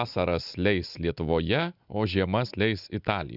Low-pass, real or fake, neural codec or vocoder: 5.4 kHz; real; none